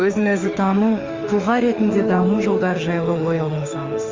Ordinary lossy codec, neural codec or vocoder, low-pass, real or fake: Opus, 32 kbps; autoencoder, 48 kHz, 32 numbers a frame, DAC-VAE, trained on Japanese speech; 7.2 kHz; fake